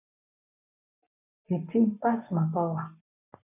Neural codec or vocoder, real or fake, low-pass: codec, 44.1 kHz, 7.8 kbps, Pupu-Codec; fake; 3.6 kHz